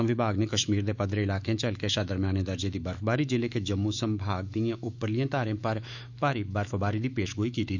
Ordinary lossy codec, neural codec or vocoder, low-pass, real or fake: none; autoencoder, 48 kHz, 128 numbers a frame, DAC-VAE, trained on Japanese speech; 7.2 kHz; fake